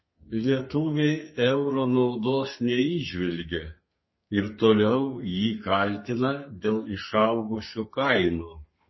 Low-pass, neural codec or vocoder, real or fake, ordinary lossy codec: 7.2 kHz; codec, 32 kHz, 1.9 kbps, SNAC; fake; MP3, 24 kbps